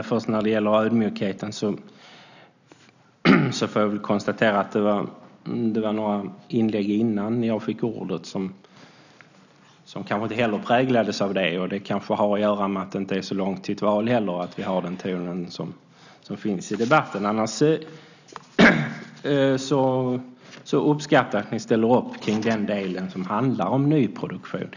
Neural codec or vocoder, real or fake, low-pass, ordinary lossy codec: none; real; 7.2 kHz; none